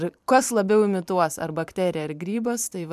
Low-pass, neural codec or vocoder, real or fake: 14.4 kHz; vocoder, 44.1 kHz, 128 mel bands every 512 samples, BigVGAN v2; fake